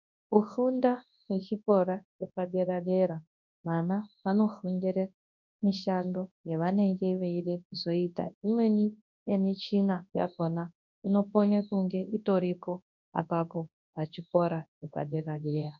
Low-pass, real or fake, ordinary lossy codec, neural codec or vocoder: 7.2 kHz; fake; MP3, 64 kbps; codec, 24 kHz, 0.9 kbps, WavTokenizer, large speech release